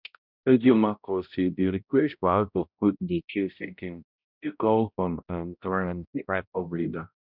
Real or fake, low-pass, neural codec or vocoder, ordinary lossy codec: fake; 5.4 kHz; codec, 16 kHz, 0.5 kbps, X-Codec, HuBERT features, trained on balanced general audio; none